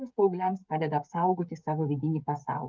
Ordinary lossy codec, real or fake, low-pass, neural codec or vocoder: Opus, 24 kbps; fake; 7.2 kHz; codec, 16 kHz, 16 kbps, FreqCodec, smaller model